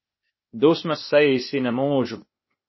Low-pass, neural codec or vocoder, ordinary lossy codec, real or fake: 7.2 kHz; codec, 16 kHz, 0.8 kbps, ZipCodec; MP3, 24 kbps; fake